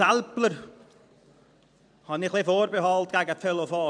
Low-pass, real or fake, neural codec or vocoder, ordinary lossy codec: 9.9 kHz; fake; vocoder, 44.1 kHz, 128 mel bands every 512 samples, BigVGAN v2; none